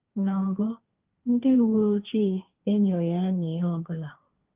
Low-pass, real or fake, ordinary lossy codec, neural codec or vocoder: 3.6 kHz; fake; Opus, 24 kbps; codec, 16 kHz, 1.1 kbps, Voila-Tokenizer